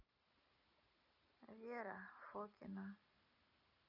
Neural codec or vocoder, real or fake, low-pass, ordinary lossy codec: none; real; 5.4 kHz; none